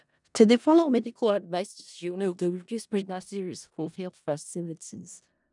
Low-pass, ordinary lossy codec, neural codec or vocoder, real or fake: 10.8 kHz; none; codec, 16 kHz in and 24 kHz out, 0.4 kbps, LongCat-Audio-Codec, four codebook decoder; fake